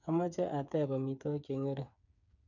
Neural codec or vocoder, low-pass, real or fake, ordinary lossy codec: codec, 16 kHz, 8 kbps, FreqCodec, smaller model; 7.2 kHz; fake; none